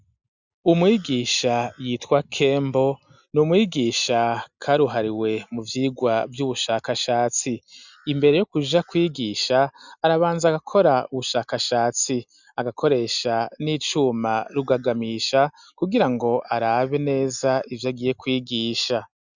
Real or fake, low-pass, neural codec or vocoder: real; 7.2 kHz; none